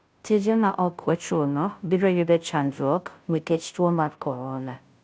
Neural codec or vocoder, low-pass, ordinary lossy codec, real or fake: codec, 16 kHz, 0.5 kbps, FunCodec, trained on Chinese and English, 25 frames a second; none; none; fake